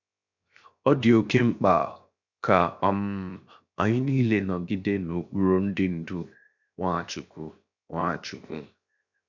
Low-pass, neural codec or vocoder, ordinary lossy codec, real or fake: 7.2 kHz; codec, 16 kHz, 0.7 kbps, FocalCodec; none; fake